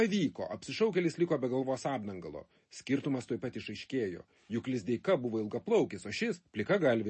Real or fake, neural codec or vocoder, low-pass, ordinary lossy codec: real; none; 10.8 kHz; MP3, 32 kbps